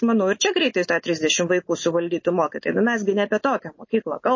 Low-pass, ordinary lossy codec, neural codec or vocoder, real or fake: 7.2 kHz; MP3, 32 kbps; none; real